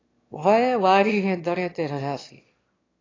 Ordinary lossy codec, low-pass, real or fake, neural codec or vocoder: AAC, 32 kbps; 7.2 kHz; fake; autoencoder, 22.05 kHz, a latent of 192 numbers a frame, VITS, trained on one speaker